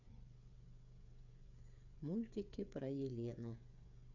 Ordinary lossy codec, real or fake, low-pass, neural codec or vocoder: none; fake; 7.2 kHz; codec, 16 kHz, 8 kbps, FreqCodec, smaller model